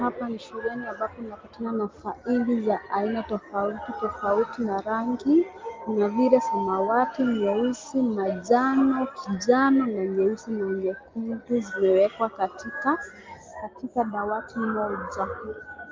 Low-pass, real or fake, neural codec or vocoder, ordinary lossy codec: 7.2 kHz; real; none; Opus, 32 kbps